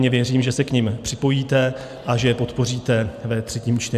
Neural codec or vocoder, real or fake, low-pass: none; real; 14.4 kHz